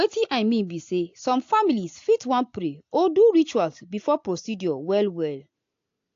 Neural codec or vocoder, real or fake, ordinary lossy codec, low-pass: none; real; AAC, 48 kbps; 7.2 kHz